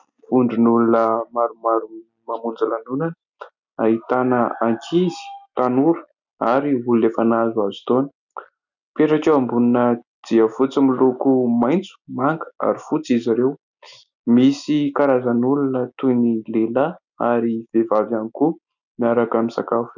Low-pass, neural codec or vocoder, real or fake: 7.2 kHz; none; real